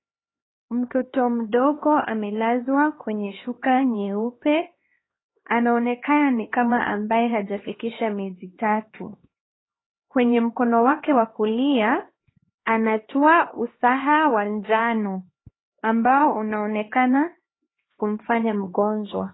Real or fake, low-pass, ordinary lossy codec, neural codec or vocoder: fake; 7.2 kHz; AAC, 16 kbps; codec, 16 kHz, 2 kbps, X-Codec, HuBERT features, trained on LibriSpeech